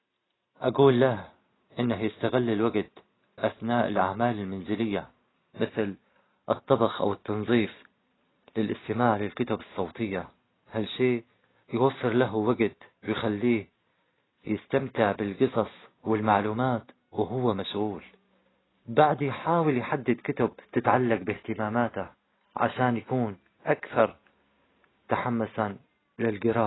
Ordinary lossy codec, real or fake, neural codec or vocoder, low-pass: AAC, 16 kbps; real; none; 7.2 kHz